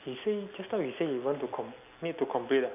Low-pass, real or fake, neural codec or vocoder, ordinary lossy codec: 3.6 kHz; real; none; none